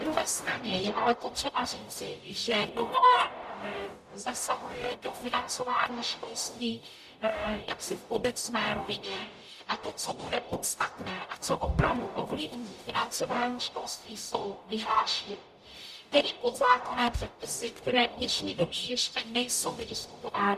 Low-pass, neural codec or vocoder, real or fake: 14.4 kHz; codec, 44.1 kHz, 0.9 kbps, DAC; fake